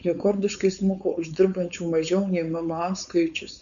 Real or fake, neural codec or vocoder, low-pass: fake; codec, 16 kHz, 4.8 kbps, FACodec; 7.2 kHz